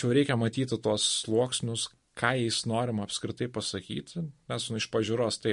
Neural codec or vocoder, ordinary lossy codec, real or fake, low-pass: none; MP3, 48 kbps; real; 10.8 kHz